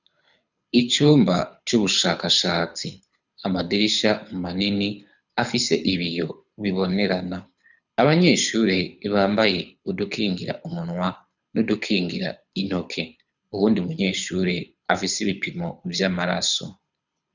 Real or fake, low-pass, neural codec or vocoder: fake; 7.2 kHz; codec, 24 kHz, 6 kbps, HILCodec